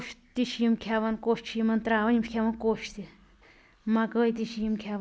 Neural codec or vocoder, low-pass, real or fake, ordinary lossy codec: none; none; real; none